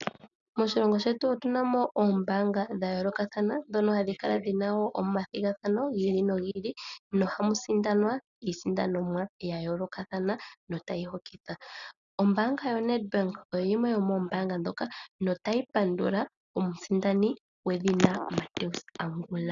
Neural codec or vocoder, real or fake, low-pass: none; real; 7.2 kHz